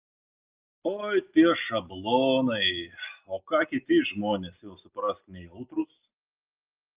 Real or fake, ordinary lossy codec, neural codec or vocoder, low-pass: real; Opus, 24 kbps; none; 3.6 kHz